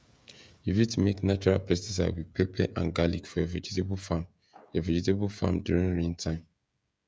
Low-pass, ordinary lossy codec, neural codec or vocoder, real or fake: none; none; codec, 16 kHz, 16 kbps, FreqCodec, smaller model; fake